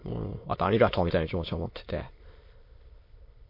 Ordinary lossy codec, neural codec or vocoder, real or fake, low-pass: MP3, 32 kbps; autoencoder, 22.05 kHz, a latent of 192 numbers a frame, VITS, trained on many speakers; fake; 5.4 kHz